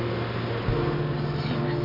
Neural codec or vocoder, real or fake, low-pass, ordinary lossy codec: none; real; 5.4 kHz; AAC, 24 kbps